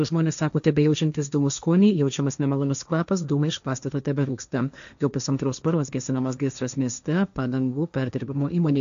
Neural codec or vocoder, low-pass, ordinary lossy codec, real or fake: codec, 16 kHz, 1.1 kbps, Voila-Tokenizer; 7.2 kHz; AAC, 96 kbps; fake